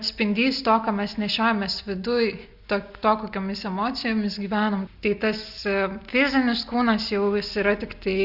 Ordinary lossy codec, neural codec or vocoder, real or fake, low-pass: Opus, 64 kbps; none; real; 5.4 kHz